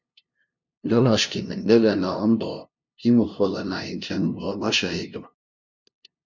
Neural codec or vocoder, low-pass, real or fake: codec, 16 kHz, 0.5 kbps, FunCodec, trained on LibriTTS, 25 frames a second; 7.2 kHz; fake